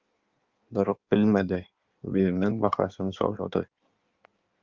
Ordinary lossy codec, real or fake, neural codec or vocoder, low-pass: Opus, 32 kbps; fake; codec, 16 kHz in and 24 kHz out, 1.1 kbps, FireRedTTS-2 codec; 7.2 kHz